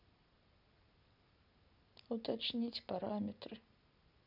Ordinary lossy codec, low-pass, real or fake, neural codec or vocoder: none; 5.4 kHz; real; none